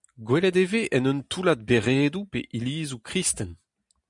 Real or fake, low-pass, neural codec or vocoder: real; 10.8 kHz; none